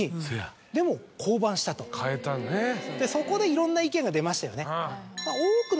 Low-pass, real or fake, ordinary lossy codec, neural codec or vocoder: none; real; none; none